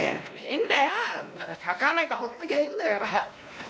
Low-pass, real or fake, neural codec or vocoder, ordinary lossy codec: none; fake; codec, 16 kHz, 1 kbps, X-Codec, WavLM features, trained on Multilingual LibriSpeech; none